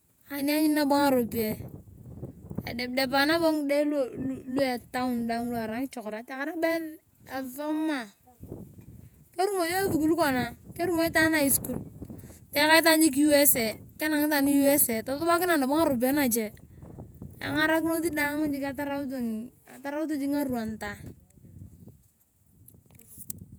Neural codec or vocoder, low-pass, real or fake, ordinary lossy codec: vocoder, 48 kHz, 128 mel bands, Vocos; none; fake; none